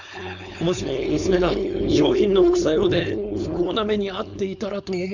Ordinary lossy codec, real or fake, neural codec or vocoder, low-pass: none; fake; codec, 16 kHz, 4.8 kbps, FACodec; 7.2 kHz